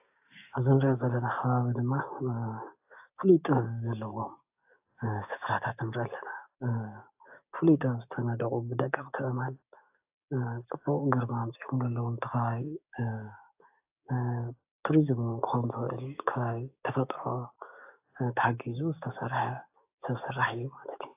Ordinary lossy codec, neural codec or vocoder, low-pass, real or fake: AAC, 32 kbps; codec, 16 kHz, 6 kbps, DAC; 3.6 kHz; fake